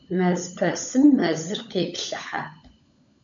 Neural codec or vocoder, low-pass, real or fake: codec, 16 kHz, 16 kbps, FunCodec, trained on LibriTTS, 50 frames a second; 7.2 kHz; fake